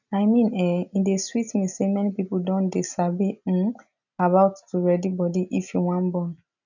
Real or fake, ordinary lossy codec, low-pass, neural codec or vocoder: real; none; 7.2 kHz; none